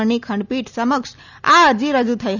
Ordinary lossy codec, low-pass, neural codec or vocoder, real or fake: none; 7.2 kHz; none; real